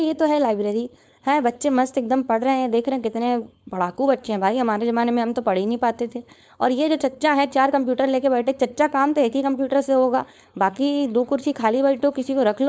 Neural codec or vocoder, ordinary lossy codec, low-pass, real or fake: codec, 16 kHz, 4.8 kbps, FACodec; none; none; fake